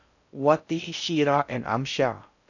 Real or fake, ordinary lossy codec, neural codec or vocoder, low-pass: fake; none; codec, 16 kHz in and 24 kHz out, 0.6 kbps, FocalCodec, streaming, 4096 codes; 7.2 kHz